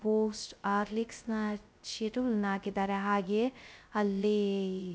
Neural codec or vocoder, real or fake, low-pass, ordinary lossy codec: codec, 16 kHz, 0.2 kbps, FocalCodec; fake; none; none